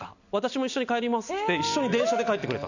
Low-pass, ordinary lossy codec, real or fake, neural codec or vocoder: 7.2 kHz; none; real; none